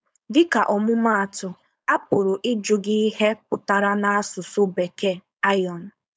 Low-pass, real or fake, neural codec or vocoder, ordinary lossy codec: none; fake; codec, 16 kHz, 4.8 kbps, FACodec; none